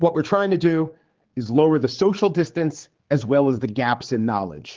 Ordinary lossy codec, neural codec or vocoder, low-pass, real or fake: Opus, 16 kbps; codec, 16 kHz, 4 kbps, X-Codec, HuBERT features, trained on general audio; 7.2 kHz; fake